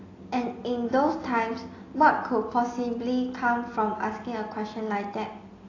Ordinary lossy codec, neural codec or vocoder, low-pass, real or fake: AAC, 32 kbps; none; 7.2 kHz; real